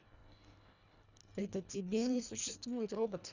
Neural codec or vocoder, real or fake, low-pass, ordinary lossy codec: codec, 24 kHz, 1.5 kbps, HILCodec; fake; 7.2 kHz; none